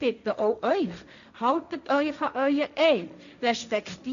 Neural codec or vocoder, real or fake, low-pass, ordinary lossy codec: codec, 16 kHz, 1.1 kbps, Voila-Tokenizer; fake; 7.2 kHz; none